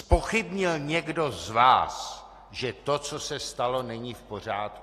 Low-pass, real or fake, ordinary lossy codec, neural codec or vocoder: 14.4 kHz; real; AAC, 48 kbps; none